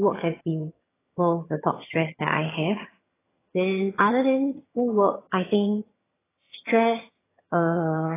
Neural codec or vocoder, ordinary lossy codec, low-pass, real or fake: vocoder, 22.05 kHz, 80 mel bands, HiFi-GAN; AAC, 16 kbps; 3.6 kHz; fake